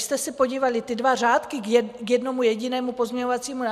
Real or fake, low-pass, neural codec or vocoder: real; 14.4 kHz; none